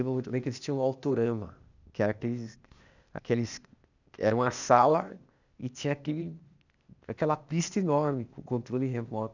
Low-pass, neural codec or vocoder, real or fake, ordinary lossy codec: 7.2 kHz; codec, 16 kHz, 0.8 kbps, ZipCodec; fake; none